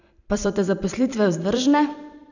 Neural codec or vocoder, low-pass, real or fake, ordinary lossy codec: vocoder, 44.1 kHz, 128 mel bands every 512 samples, BigVGAN v2; 7.2 kHz; fake; none